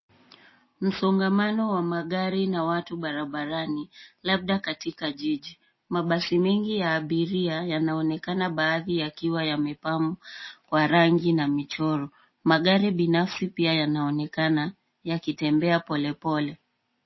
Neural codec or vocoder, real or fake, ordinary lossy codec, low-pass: none; real; MP3, 24 kbps; 7.2 kHz